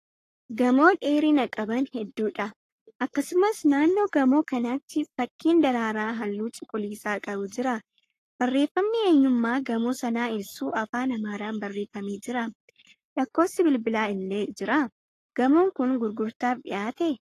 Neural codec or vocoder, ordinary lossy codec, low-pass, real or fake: codec, 44.1 kHz, 7.8 kbps, Pupu-Codec; AAC, 48 kbps; 14.4 kHz; fake